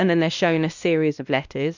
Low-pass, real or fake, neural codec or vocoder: 7.2 kHz; fake; codec, 16 kHz, 1 kbps, X-Codec, WavLM features, trained on Multilingual LibriSpeech